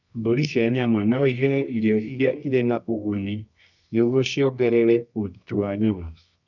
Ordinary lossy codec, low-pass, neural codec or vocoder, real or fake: none; 7.2 kHz; codec, 24 kHz, 0.9 kbps, WavTokenizer, medium music audio release; fake